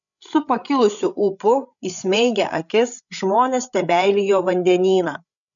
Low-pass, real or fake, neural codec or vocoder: 7.2 kHz; fake; codec, 16 kHz, 8 kbps, FreqCodec, larger model